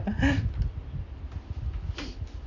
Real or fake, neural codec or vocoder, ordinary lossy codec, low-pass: real; none; none; 7.2 kHz